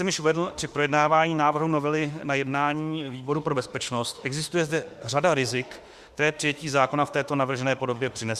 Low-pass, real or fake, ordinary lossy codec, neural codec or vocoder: 14.4 kHz; fake; Opus, 64 kbps; autoencoder, 48 kHz, 32 numbers a frame, DAC-VAE, trained on Japanese speech